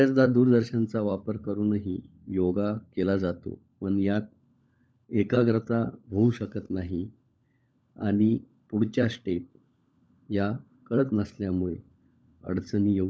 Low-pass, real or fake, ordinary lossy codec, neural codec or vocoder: none; fake; none; codec, 16 kHz, 16 kbps, FunCodec, trained on LibriTTS, 50 frames a second